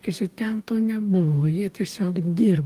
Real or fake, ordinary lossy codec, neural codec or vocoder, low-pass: fake; Opus, 32 kbps; codec, 44.1 kHz, 2.6 kbps, DAC; 14.4 kHz